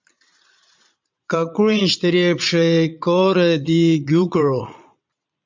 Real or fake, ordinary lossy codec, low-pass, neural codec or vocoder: fake; MP3, 64 kbps; 7.2 kHz; vocoder, 22.05 kHz, 80 mel bands, Vocos